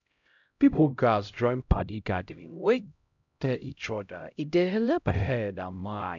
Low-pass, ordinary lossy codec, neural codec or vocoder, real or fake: 7.2 kHz; none; codec, 16 kHz, 0.5 kbps, X-Codec, HuBERT features, trained on LibriSpeech; fake